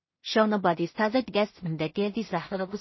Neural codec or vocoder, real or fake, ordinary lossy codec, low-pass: codec, 16 kHz in and 24 kHz out, 0.4 kbps, LongCat-Audio-Codec, two codebook decoder; fake; MP3, 24 kbps; 7.2 kHz